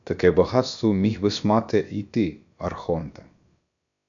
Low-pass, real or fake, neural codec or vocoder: 7.2 kHz; fake; codec, 16 kHz, about 1 kbps, DyCAST, with the encoder's durations